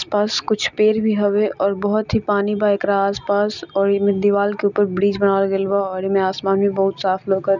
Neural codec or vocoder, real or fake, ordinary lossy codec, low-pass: none; real; none; 7.2 kHz